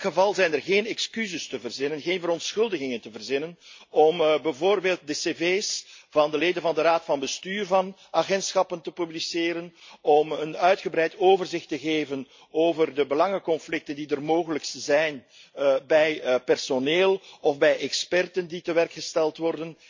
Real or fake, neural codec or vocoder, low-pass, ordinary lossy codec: real; none; 7.2 kHz; MP3, 48 kbps